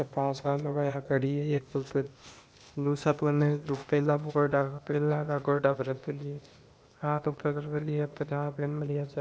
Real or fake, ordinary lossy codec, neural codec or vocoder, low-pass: fake; none; codec, 16 kHz, 0.8 kbps, ZipCodec; none